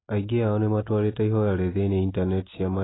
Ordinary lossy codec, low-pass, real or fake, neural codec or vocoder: AAC, 16 kbps; 7.2 kHz; real; none